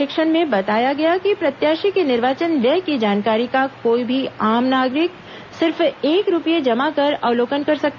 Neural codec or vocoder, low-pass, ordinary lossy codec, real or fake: none; 7.2 kHz; none; real